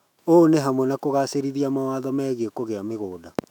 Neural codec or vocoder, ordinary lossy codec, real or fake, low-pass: autoencoder, 48 kHz, 128 numbers a frame, DAC-VAE, trained on Japanese speech; none; fake; 19.8 kHz